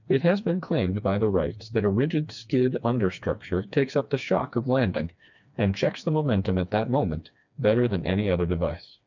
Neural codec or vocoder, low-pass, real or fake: codec, 16 kHz, 2 kbps, FreqCodec, smaller model; 7.2 kHz; fake